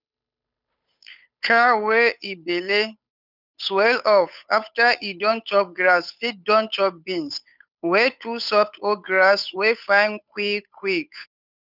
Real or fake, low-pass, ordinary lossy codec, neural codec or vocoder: fake; 5.4 kHz; none; codec, 16 kHz, 8 kbps, FunCodec, trained on Chinese and English, 25 frames a second